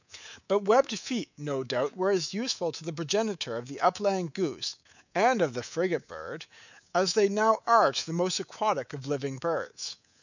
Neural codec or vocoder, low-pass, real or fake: codec, 24 kHz, 3.1 kbps, DualCodec; 7.2 kHz; fake